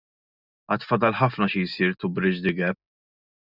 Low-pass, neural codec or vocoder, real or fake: 5.4 kHz; none; real